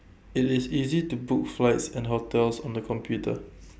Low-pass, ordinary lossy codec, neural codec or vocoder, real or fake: none; none; none; real